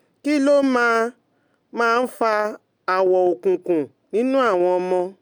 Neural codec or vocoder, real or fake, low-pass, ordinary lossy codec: none; real; 19.8 kHz; none